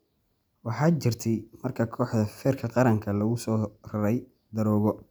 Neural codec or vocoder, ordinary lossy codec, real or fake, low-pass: none; none; real; none